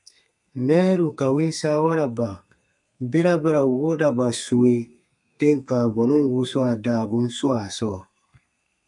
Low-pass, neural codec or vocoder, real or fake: 10.8 kHz; codec, 32 kHz, 1.9 kbps, SNAC; fake